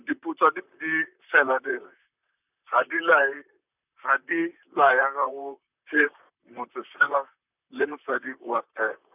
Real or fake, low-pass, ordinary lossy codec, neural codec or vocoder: fake; 3.6 kHz; none; vocoder, 44.1 kHz, 128 mel bands, Pupu-Vocoder